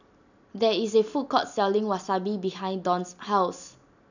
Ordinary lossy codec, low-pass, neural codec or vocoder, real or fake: none; 7.2 kHz; none; real